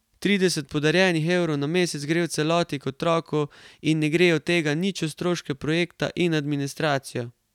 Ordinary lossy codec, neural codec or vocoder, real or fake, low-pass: none; none; real; 19.8 kHz